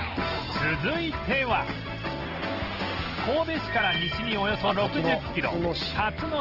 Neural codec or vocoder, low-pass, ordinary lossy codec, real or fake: none; 5.4 kHz; Opus, 16 kbps; real